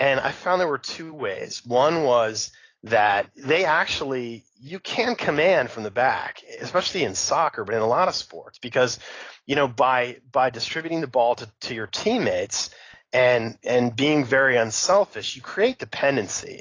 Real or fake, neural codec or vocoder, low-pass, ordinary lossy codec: real; none; 7.2 kHz; AAC, 32 kbps